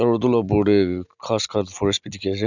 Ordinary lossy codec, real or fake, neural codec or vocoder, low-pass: none; real; none; 7.2 kHz